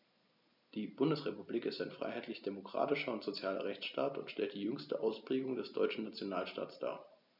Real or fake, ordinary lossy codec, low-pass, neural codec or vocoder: real; none; 5.4 kHz; none